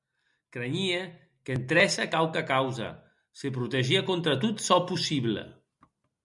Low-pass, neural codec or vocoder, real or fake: 10.8 kHz; none; real